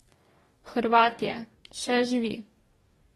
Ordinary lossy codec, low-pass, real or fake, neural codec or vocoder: AAC, 32 kbps; 19.8 kHz; fake; codec, 44.1 kHz, 2.6 kbps, DAC